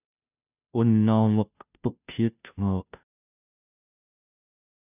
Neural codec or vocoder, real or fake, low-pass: codec, 16 kHz, 0.5 kbps, FunCodec, trained on Chinese and English, 25 frames a second; fake; 3.6 kHz